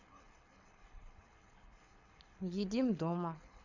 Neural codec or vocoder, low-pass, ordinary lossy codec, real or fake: codec, 24 kHz, 6 kbps, HILCodec; 7.2 kHz; none; fake